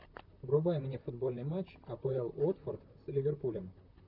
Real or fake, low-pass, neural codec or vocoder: fake; 5.4 kHz; vocoder, 44.1 kHz, 128 mel bands, Pupu-Vocoder